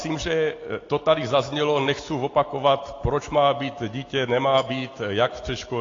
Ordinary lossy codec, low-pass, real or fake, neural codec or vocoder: AAC, 32 kbps; 7.2 kHz; real; none